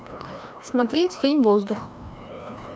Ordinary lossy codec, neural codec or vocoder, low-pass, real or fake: none; codec, 16 kHz, 1 kbps, FreqCodec, larger model; none; fake